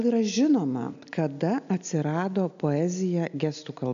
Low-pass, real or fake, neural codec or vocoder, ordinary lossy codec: 7.2 kHz; real; none; AAC, 96 kbps